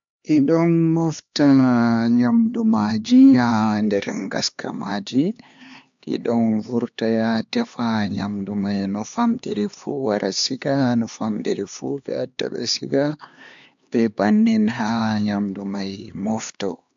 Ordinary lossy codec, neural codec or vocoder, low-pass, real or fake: MP3, 64 kbps; codec, 16 kHz, 2 kbps, X-Codec, HuBERT features, trained on LibriSpeech; 7.2 kHz; fake